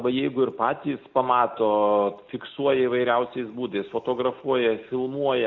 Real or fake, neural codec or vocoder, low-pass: real; none; 7.2 kHz